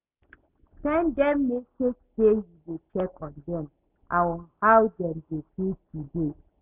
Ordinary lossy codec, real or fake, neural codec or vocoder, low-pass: Opus, 64 kbps; real; none; 3.6 kHz